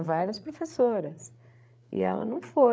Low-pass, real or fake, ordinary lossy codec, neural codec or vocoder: none; fake; none; codec, 16 kHz, 8 kbps, FreqCodec, larger model